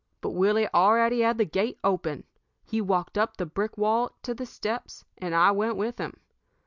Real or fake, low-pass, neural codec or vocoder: real; 7.2 kHz; none